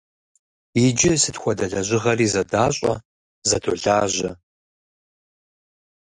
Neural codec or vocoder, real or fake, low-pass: none; real; 10.8 kHz